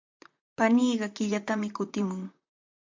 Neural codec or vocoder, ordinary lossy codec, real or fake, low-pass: vocoder, 44.1 kHz, 128 mel bands, Pupu-Vocoder; MP3, 64 kbps; fake; 7.2 kHz